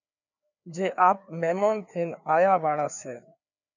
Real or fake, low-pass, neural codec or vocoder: fake; 7.2 kHz; codec, 16 kHz, 2 kbps, FreqCodec, larger model